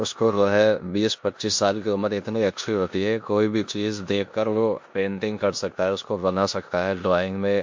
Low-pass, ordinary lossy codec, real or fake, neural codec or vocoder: 7.2 kHz; MP3, 48 kbps; fake; codec, 16 kHz in and 24 kHz out, 0.9 kbps, LongCat-Audio-Codec, four codebook decoder